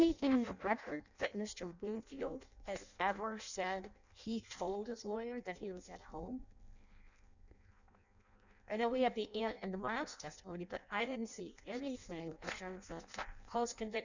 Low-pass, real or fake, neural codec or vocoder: 7.2 kHz; fake; codec, 16 kHz in and 24 kHz out, 0.6 kbps, FireRedTTS-2 codec